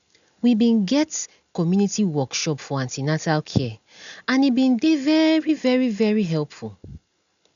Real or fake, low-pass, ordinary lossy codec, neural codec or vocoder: real; 7.2 kHz; none; none